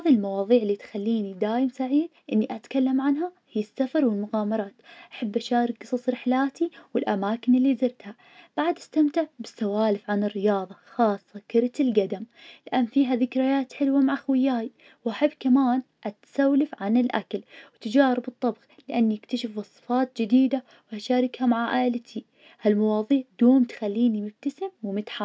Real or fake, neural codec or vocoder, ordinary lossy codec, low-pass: real; none; none; none